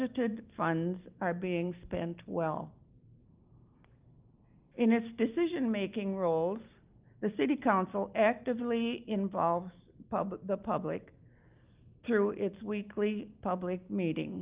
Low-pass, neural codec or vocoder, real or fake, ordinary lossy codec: 3.6 kHz; none; real; Opus, 24 kbps